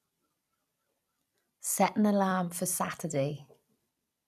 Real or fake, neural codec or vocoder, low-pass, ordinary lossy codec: fake; vocoder, 44.1 kHz, 128 mel bands every 256 samples, BigVGAN v2; 14.4 kHz; none